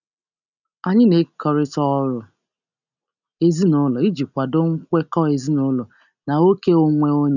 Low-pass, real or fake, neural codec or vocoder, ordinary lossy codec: 7.2 kHz; real; none; none